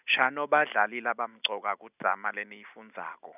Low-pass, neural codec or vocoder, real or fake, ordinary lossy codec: 3.6 kHz; none; real; none